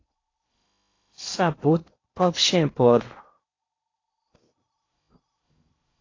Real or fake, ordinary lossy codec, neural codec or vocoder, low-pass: fake; AAC, 32 kbps; codec, 16 kHz in and 24 kHz out, 0.8 kbps, FocalCodec, streaming, 65536 codes; 7.2 kHz